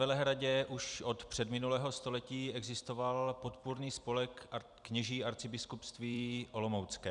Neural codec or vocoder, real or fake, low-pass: vocoder, 44.1 kHz, 128 mel bands every 256 samples, BigVGAN v2; fake; 10.8 kHz